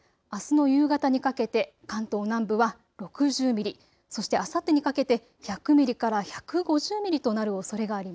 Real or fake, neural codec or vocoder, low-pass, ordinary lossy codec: real; none; none; none